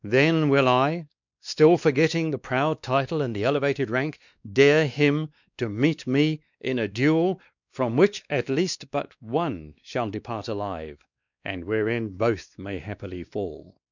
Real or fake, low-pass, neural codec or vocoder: fake; 7.2 kHz; codec, 16 kHz, 2 kbps, X-Codec, WavLM features, trained on Multilingual LibriSpeech